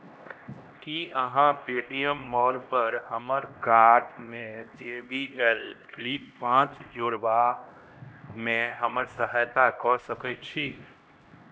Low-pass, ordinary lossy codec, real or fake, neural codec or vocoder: none; none; fake; codec, 16 kHz, 1 kbps, X-Codec, HuBERT features, trained on LibriSpeech